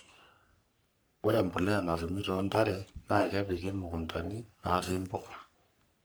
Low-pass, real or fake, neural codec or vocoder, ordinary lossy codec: none; fake; codec, 44.1 kHz, 3.4 kbps, Pupu-Codec; none